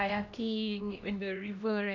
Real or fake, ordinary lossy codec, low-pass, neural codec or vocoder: fake; none; 7.2 kHz; codec, 16 kHz, 1 kbps, X-Codec, HuBERT features, trained on LibriSpeech